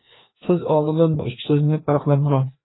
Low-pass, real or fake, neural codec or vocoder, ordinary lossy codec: 7.2 kHz; fake; codec, 16 kHz, 1 kbps, FreqCodec, larger model; AAC, 16 kbps